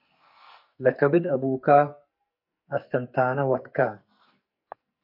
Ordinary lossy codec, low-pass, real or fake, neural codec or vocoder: MP3, 32 kbps; 5.4 kHz; fake; codec, 44.1 kHz, 2.6 kbps, SNAC